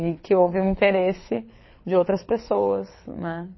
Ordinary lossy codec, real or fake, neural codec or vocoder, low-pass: MP3, 24 kbps; fake; codec, 24 kHz, 6 kbps, HILCodec; 7.2 kHz